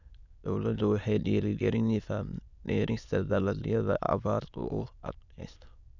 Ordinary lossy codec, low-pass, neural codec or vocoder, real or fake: none; 7.2 kHz; autoencoder, 22.05 kHz, a latent of 192 numbers a frame, VITS, trained on many speakers; fake